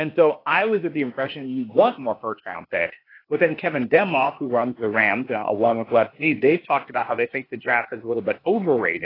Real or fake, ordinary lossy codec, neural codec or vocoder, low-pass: fake; AAC, 24 kbps; codec, 16 kHz, 0.8 kbps, ZipCodec; 5.4 kHz